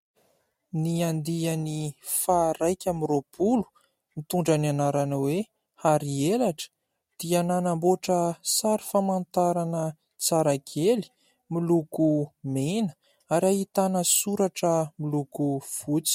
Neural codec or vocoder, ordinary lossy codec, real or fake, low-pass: none; MP3, 64 kbps; real; 19.8 kHz